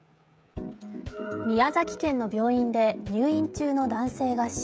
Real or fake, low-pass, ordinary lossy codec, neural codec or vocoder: fake; none; none; codec, 16 kHz, 16 kbps, FreqCodec, smaller model